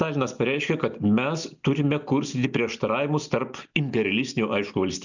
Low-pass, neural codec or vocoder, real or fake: 7.2 kHz; none; real